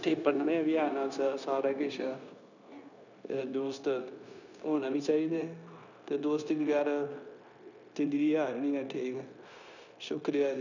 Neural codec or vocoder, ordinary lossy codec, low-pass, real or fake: codec, 16 kHz, 0.9 kbps, LongCat-Audio-Codec; none; 7.2 kHz; fake